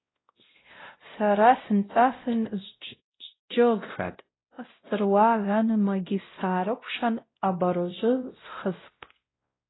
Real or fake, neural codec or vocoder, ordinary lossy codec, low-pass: fake; codec, 16 kHz, 0.5 kbps, X-Codec, WavLM features, trained on Multilingual LibriSpeech; AAC, 16 kbps; 7.2 kHz